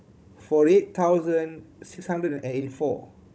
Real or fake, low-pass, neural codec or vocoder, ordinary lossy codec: fake; none; codec, 16 kHz, 16 kbps, FunCodec, trained on Chinese and English, 50 frames a second; none